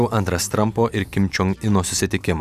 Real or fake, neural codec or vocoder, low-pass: fake; vocoder, 44.1 kHz, 128 mel bands every 512 samples, BigVGAN v2; 14.4 kHz